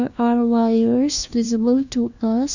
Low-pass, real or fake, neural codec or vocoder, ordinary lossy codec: 7.2 kHz; fake; codec, 16 kHz, 1 kbps, FunCodec, trained on LibriTTS, 50 frames a second; none